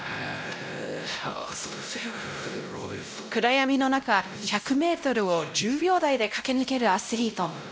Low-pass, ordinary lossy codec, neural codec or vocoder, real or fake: none; none; codec, 16 kHz, 0.5 kbps, X-Codec, WavLM features, trained on Multilingual LibriSpeech; fake